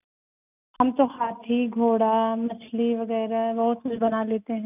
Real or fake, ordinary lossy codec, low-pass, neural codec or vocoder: real; none; 3.6 kHz; none